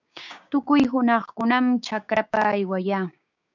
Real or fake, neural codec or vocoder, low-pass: fake; codec, 16 kHz, 6 kbps, DAC; 7.2 kHz